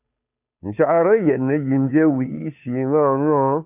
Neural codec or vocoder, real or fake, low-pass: codec, 16 kHz, 2 kbps, FunCodec, trained on Chinese and English, 25 frames a second; fake; 3.6 kHz